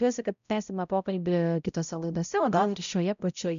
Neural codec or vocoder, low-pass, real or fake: codec, 16 kHz, 0.5 kbps, X-Codec, HuBERT features, trained on balanced general audio; 7.2 kHz; fake